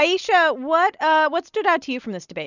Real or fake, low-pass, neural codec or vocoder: real; 7.2 kHz; none